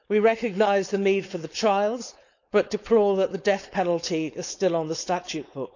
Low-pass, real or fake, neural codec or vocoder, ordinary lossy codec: 7.2 kHz; fake; codec, 16 kHz, 4.8 kbps, FACodec; none